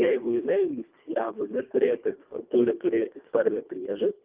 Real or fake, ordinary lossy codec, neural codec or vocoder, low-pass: fake; Opus, 32 kbps; codec, 24 kHz, 1.5 kbps, HILCodec; 3.6 kHz